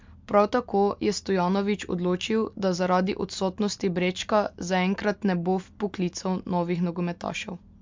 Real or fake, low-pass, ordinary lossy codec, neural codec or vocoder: real; 7.2 kHz; MP3, 64 kbps; none